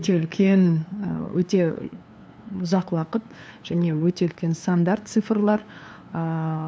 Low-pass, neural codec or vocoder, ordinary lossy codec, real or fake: none; codec, 16 kHz, 2 kbps, FunCodec, trained on LibriTTS, 25 frames a second; none; fake